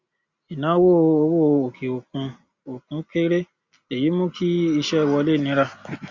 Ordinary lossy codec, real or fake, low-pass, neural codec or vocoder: Opus, 64 kbps; real; 7.2 kHz; none